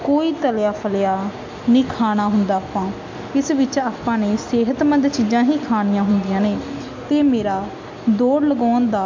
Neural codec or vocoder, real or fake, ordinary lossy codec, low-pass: none; real; MP3, 48 kbps; 7.2 kHz